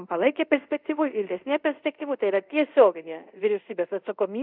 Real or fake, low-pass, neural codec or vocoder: fake; 5.4 kHz; codec, 24 kHz, 0.5 kbps, DualCodec